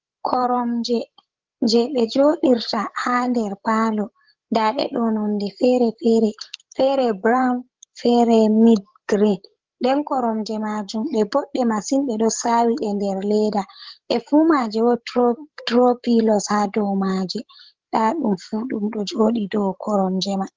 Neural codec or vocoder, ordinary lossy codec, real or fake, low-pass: codec, 16 kHz, 16 kbps, FreqCodec, larger model; Opus, 16 kbps; fake; 7.2 kHz